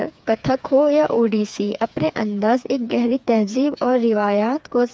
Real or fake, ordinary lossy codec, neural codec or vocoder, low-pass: fake; none; codec, 16 kHz, 4 kbps, FreqCodec, smaller model; none